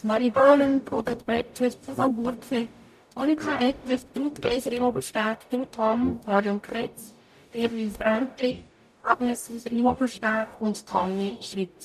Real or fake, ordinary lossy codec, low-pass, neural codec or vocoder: fake; none; 14.4 kHz; codec, 44.1 kHz, 0.9 kbps, DAC